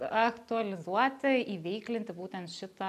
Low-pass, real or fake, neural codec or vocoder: 14.4 kHz; real; none